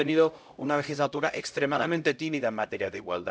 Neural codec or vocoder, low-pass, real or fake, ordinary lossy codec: codec, 16 kHz, 0.5 kbps, X-Codec, HuBERT features, trained on LibriSpeech; none; fake; none